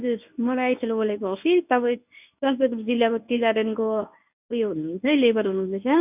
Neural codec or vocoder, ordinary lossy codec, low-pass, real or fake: codec, 24 kHz, 0.9 kbps, WavTokenizer, medium speech release version 1; none; 3.6 kHz; fake